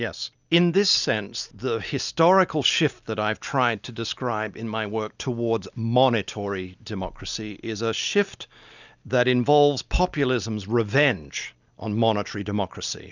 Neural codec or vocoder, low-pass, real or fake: none; 7.2 kHz; real